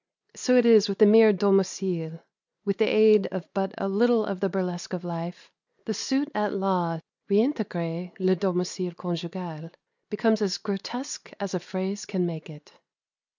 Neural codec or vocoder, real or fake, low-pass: none; real; 7.2 kHz